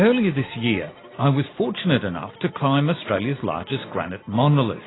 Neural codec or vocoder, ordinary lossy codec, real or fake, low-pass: none; AAC, 16 kbps; real; 7.2 kHz